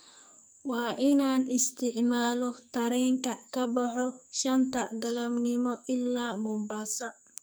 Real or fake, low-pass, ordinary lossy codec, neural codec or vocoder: fake; none; none; codec, 44.1 kHz, 2.6 kbps, SNAC